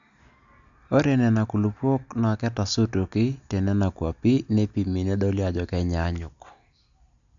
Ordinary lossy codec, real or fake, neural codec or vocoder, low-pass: none; real; none; 7.2 kHz